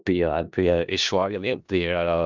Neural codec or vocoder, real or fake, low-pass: codec, 16 kHz in and 24 kHz out, 0.4 kbps, LongCat-Audio-Codec, four codebook decoder; fake; 7.2 kHz